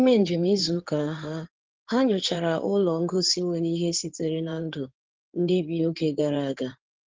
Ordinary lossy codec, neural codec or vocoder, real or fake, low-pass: Opus, 16 kbps; codec, 16 kHz in and 24 kHz out, 2.2 kbps, FireRedTTS-2 codec; fake; 7.2 kHz